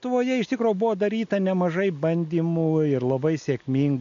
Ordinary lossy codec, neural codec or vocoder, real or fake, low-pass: AAC, 64 kbps; none; real; 7.2 kHz